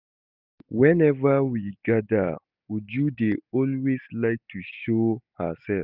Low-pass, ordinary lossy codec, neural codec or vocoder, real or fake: 5.4 kHz; none; none; real